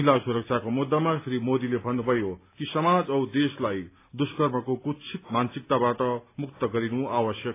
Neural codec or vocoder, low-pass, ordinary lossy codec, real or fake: none; 3.6 kHz; AAC, 24 kbps; real